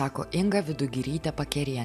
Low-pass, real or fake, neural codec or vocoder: 14.4 kHz; real; none